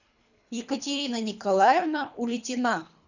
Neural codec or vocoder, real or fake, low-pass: codec, 24 kHz, 3 kbps, HILCodec; fake; 7.2 kHz